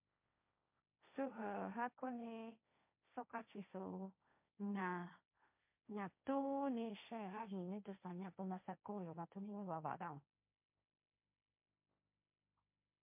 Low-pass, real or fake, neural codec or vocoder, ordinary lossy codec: 3.6 kHz; fake; codec, 16 kHz, 1.1 kbps, Voila-Tokenizer; MP3, 32 kbps